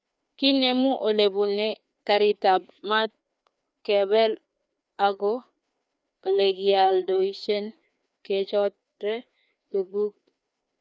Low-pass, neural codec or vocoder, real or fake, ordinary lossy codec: none; codec, 16 kHz, 2 kbps, FreqCodec, larger model; fake; none